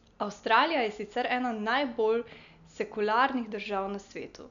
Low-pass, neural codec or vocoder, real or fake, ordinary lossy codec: 7.2 kHz; none; real; none